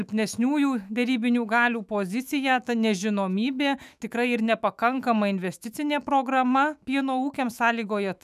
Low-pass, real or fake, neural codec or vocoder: 14.4 kHz; fake; autoencoder, 48 kHz, 128 numbers a frame, DAC-VAE, trained on Japanese speech